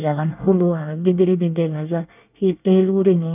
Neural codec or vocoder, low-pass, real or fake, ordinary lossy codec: codec, 24 kHz, 1 kbps, SNAC; 3.6 kHz; fake; none